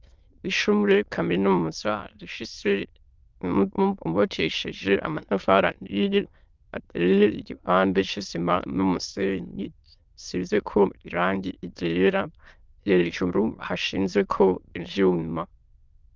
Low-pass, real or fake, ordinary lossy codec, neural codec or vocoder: 7.2 kHz; fake; Opus, 24 kbps; autoencoder, 22.05 kHz, a latent of 192 numbers a frame, VITS, trained on many speakers